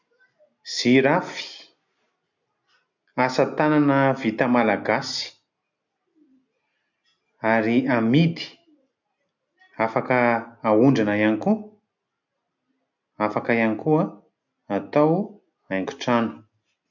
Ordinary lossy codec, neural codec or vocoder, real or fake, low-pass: MP3, 64 kbps; none; real; 7.2 kHz